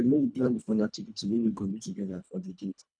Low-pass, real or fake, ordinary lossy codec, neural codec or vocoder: 9.9 kHz; fake; none; codec, 24 kHz, 1.5 kbps, HILCodec